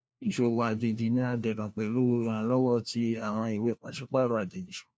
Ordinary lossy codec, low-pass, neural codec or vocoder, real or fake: none; none; codec, 16 kHz, 1 kbps, FunCodec, trained on LibriTTS, 50 frames a second; fake